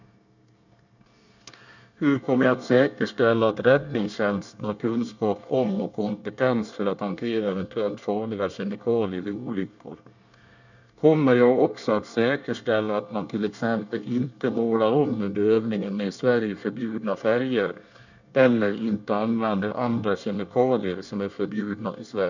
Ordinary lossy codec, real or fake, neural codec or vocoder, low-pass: none; fake; codec, 24 kHz, 1 kbps, SNAC; 7.2 kHz